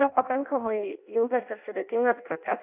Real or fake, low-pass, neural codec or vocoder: fake; 3.6 kHz; codec, 16 kHz in and 24 kHz out, 0.6 kbps, FireRedTTS-2 codec